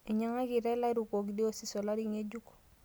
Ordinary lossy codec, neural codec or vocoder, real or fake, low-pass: none; none; real; none